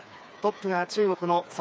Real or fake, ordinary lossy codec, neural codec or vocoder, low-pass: fake; none; codec, 16 kHz, 4 kbps, FreqCodec, smaller model; none